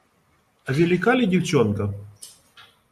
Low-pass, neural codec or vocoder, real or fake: 14.4 kHz; none; real